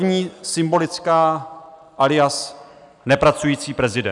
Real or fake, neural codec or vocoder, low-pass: real; none; 10.8 kHz